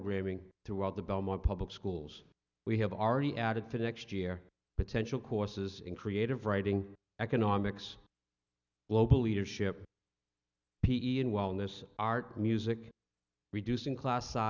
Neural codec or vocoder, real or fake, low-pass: none; real; 7.2 kHz